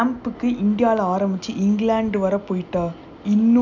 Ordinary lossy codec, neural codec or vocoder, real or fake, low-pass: none; none; real; 7.2 kHz